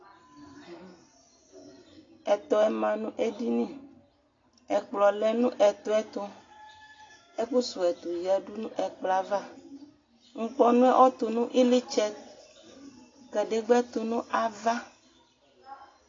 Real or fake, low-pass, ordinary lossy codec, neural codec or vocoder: real; 7.2 kHz; AAC, 32 kbps; none